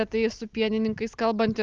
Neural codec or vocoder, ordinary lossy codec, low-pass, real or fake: none; Opus, 32 kbps; 7.2 kHz; real